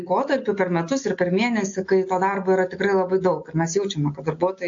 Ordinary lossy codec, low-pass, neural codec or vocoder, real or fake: AAC, 48 kbps; 7.2 kHz; none; real